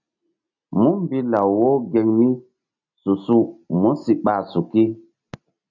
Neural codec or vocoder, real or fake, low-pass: none; real; 7.2 kHz